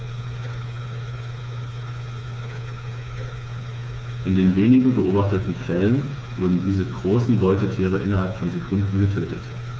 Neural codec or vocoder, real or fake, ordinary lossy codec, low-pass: codec, 16 kHz, 4 kbps, FreqCodec, smaller model; fake; none; none